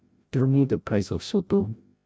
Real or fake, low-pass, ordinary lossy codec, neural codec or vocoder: fake; none; none; codec, 16 kHz, 0.5 kbps, FreqCodec, larger model